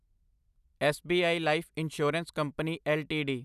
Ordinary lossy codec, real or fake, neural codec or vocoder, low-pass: none; real; none; 14.4 kHz